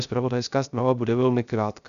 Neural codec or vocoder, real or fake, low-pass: codec, 16 kHz, 0.3 kbps, FocalCodec; fake; 7.2 kHz